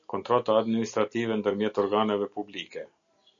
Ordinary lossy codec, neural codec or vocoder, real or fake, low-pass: MP3, 96 kbps; none; real; 7.2 kHz